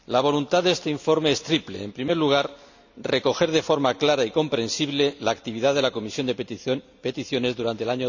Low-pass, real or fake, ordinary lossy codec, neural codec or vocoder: 7.2 kHz; real; none; none